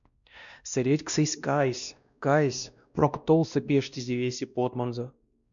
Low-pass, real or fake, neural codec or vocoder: 7.2 kHz; fake; codec, 16 kHz, 1 kbps, X-Codec, WavLM features, trained on Multilingual LibriSpeech